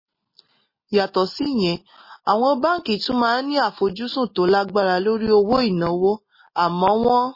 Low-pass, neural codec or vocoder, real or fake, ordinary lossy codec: 5.4 kHz; none; real; MP3, 24 kbps